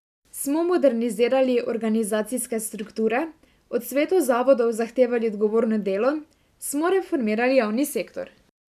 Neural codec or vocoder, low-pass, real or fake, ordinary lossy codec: none; 14.4 kHz; real; none